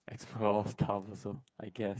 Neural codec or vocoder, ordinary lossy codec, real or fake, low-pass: codec, 16 kHz, 8 kbps, FreqCodec, smaller model; none; fake; none